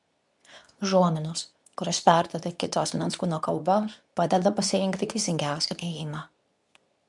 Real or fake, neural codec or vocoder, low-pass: fake; codec, 24 kHz, 0.9 kbps, WavTokenizer, medium speech release version 2; 10.8 kHz